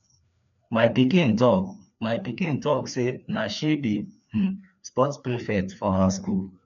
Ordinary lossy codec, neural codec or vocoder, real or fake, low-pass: none; codec, 16 kHz, 2 kbps, FreqCodec, larger model; fake; 7.2 kHz